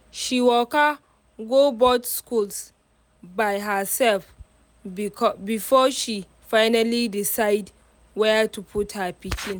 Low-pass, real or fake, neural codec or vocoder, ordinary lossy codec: none; real; none; none